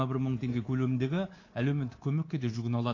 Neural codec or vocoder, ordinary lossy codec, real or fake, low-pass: codec, 24 kHz, 3.1 kbps, DualCodec; AAC, 32 kbps; fake; 7.2 kHz